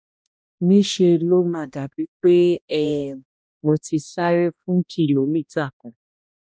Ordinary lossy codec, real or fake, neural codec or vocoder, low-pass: none; fake; codec, 16 kHz, 1 kbps, X-Codec, HuBERT features, trained on balanced general audio; none